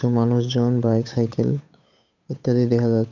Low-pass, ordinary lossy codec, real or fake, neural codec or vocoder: 7.2 kHz; none; fake; codec, 16 kHz, 8 kbps, FunCodec, trained on Chinese and English, 25 frames a second